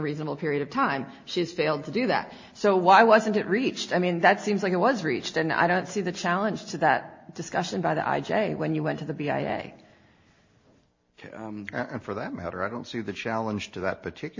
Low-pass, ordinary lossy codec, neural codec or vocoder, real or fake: 7.2 kHz; MP3, 32 kbps; none; real